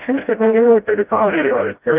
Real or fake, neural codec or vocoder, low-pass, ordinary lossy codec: fake; codec, 16 kHz, 0.5 kbps, FreqCodec, smaller model; 3.6 kHz; Opus, 24 kbps